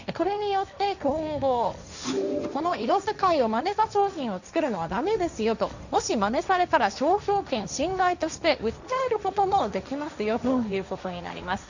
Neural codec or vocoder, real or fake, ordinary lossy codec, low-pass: codec, 16 kHz, 1.1 kbps, Voila-Tokenizer; fake; none; 7.2 kHz